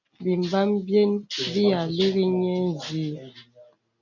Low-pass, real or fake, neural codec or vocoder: 7.2 kHz; real; none